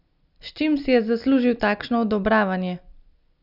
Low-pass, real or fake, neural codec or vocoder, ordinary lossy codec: 5.4 kHz; real; none; none